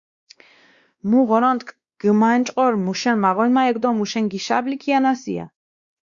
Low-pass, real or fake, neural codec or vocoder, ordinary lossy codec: 7.2 kHz; fake; codec, 16 kHz, 2 kbps, X-Codec, WavLM features, trained on Multilingual LibriSpeech; Opus, 64 kbps